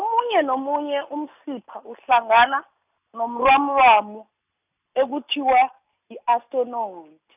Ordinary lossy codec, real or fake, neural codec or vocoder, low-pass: none; real; none; 3.6 kHz